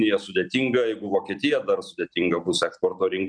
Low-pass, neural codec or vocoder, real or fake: 9.9 kHz; none; real